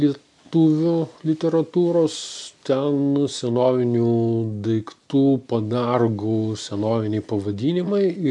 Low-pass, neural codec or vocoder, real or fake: 10.8 kHz; none; real